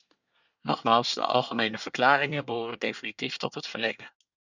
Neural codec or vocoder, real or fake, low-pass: codec, 24 kHz, 1 kbps, SNAC; fake; 7.2 kHz